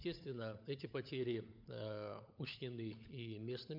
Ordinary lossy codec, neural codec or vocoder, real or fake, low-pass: MP3, 48 kbps; codec, 16 kHz, 16 kbps, FunCodec, trained on LibriTTS, 50 frames a second; fake; 5.4 kHz